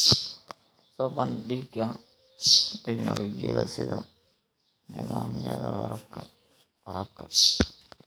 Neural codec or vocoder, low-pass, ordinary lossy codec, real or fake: codec, 44.1 kHz, 2.6 kbps, SNAC; none; none; fake